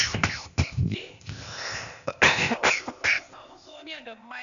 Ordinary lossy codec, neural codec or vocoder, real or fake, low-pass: none; codec, 16 kHz, 0.8 kbps, ZipCodec; fake; 7.2 kHz